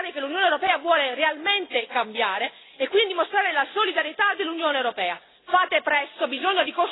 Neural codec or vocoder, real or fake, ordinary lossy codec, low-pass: none; real; AAC, 16 kbps; 7.2 kHz